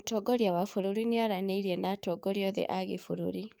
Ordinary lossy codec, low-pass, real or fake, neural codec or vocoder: none; none; fake; codec, 44.1 kHz, 7.8 kbps, DAC